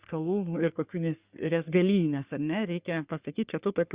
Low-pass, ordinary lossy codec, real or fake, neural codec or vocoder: 3.6 kHz; Opus, 64 kbps; fake; codec, 44.1 kHz, 3.4 kbps, Pupu-Codec